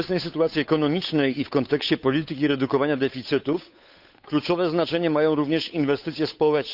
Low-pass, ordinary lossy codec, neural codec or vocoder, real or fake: 5.4 kHz; none; codec, 16 kHz, 8 kbps, FunCodec, trained on Chinese and English, 25 frames a second; fake